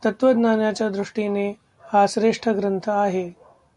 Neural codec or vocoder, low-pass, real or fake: none; 10.8 kHz; real